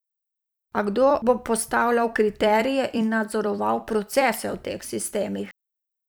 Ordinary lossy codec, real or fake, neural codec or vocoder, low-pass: none; fake; vocoder, 44.1 kHz, 128 mel bands every 256 samples, BigVGAN v2; none